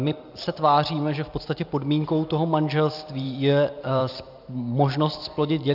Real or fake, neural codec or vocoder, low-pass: fake; vocoder, 44.1 kHz, 128 mel bands every 256 samples, BigVGAN v2; 5.4 kHz